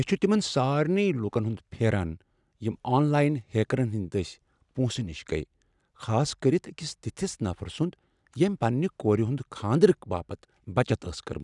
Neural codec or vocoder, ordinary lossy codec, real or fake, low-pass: none; none; real; 10.8 kHz